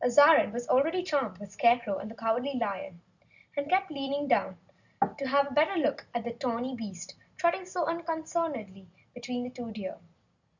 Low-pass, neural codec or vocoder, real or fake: 7.2 kHz; none; real